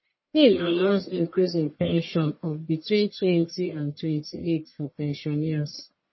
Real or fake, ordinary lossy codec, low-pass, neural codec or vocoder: fake; MP3, 24 kbps; 7.2 kHz; codec, 44.1 kHz, 1.7 kbps, Pupu-Codec